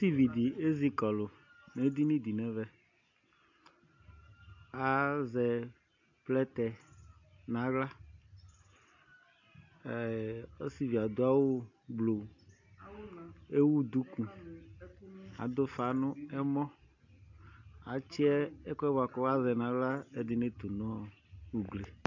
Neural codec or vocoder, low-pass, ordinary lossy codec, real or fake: none; 7.2 kHz; AAC, 48 kbps; real